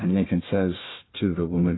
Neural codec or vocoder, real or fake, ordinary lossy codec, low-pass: codec, 16 kHz, 1 kbps, FunCodec, trained on Chinese and English, 50 frames a second; fake; AAC, 16 kbps; 7.2 kHz